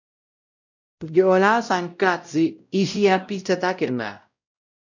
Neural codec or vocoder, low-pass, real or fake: codec, 16 kHz, 0.5 kbps, X-Codec, WavLM features, trained on Multilingual LibriSpeech; 7.2 kHz; fake